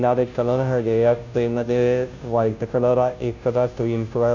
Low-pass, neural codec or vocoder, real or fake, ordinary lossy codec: 7.2 kHz; codec, 16 kHz, 0.5 kbps, FunCodec, trained on Chinese and English, 25 frames a second; fake; none